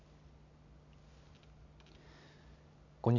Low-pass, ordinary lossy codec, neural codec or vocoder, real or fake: 7.2 kHz; none; none; real